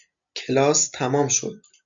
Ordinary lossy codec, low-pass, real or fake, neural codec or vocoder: MP3, 64 kbps; 7.2 kHz; real; none